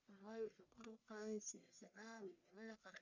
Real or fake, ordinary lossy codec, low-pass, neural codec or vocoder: fake; none; 7.2 kHz; codec, 44.1 kHz, 1.7 kbps, Pupu-Codec